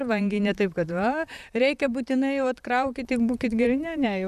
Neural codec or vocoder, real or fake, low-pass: vocoder, 44.1 kHz, 128 mel bands, Pupu-Vocoder; fake; 14.4 kHz